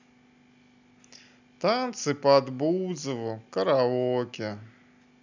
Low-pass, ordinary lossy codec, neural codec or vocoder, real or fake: 7.2 kHz; none; none; real